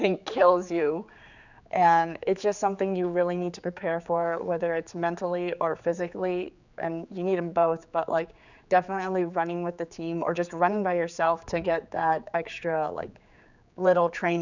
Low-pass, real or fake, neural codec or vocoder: 7.2 kHz; fake; codec, 16 kHz, 4 kbps, X-Codec, HuBERT features, trained on general audio